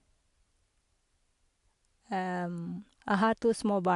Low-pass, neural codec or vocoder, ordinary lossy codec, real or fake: 10.8 kHz; none; AAC, 64 kbps; real